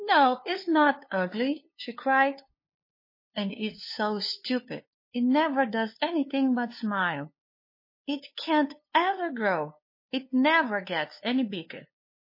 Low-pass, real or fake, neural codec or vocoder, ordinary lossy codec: 5.4 kHz; fake; codec, 16 kHz, 2 kbps, FunCodec, trained on LibriTTS, 25 frames a second; MP3, 24 kbps